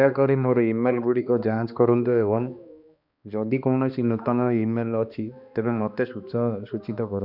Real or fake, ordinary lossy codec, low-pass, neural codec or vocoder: fake; none; 5.4 kHz; codec, 16 kHz, 2 kbps, X-Codec, HuBERT features, trained on balanced general audio